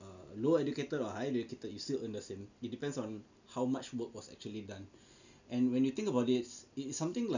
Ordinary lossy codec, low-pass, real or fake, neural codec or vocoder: none; 7.2 kHz; real; none